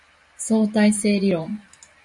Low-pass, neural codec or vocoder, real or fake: 10.8 kHz; vocoder, 44.1 kHz, 128 mel bands every 512 samples, BigVGAN v2; fake